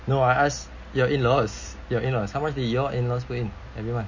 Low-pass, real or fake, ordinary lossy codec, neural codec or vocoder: 7.2 kHz; real; MP3, 32 kbps; none